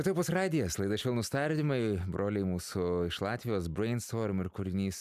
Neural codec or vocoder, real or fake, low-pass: none; real; 14.4 kHz